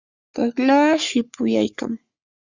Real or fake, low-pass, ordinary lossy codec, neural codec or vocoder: fake; 7.2 kHz; Opus, 64 kbps; codec, 44.1 kHz, 3.4 kbps, Pupu-Codec